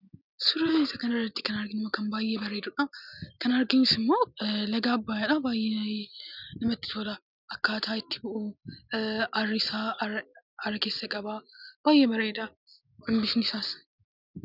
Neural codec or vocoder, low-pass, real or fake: none; 5.4 kHz; real